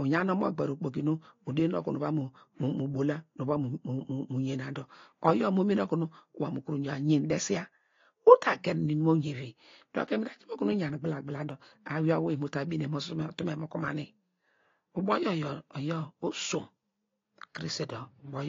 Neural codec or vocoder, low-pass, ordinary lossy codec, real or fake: none; 7.2 kHz; AAC, 32 kbps; real